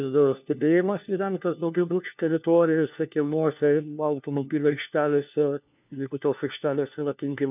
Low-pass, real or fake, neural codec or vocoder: 3.6 kHz; fake; codec, 16 kHz, 1 kbps, FunCodec, trained on LibriTTS, 50 frames a second